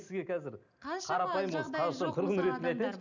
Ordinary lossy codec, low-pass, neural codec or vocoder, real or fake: none; 7.2 kHz; none; real